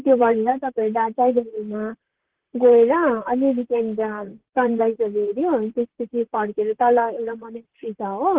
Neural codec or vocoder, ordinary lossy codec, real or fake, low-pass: vocoder, 44.1 kHz, 128 mel bands, Pupu-Vocoder; Opus, 16 kbps; fake; 3.6 kHz